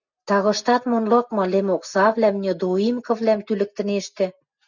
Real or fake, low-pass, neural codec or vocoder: real; 7.2 kHz; none